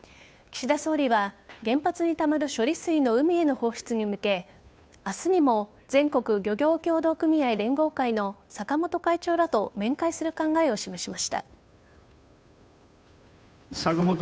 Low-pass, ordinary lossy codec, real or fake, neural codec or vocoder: none; none; fake; codec, 16 kHz, 2 kbps, FunCodec, trained on Chinese and English, 25 frames a second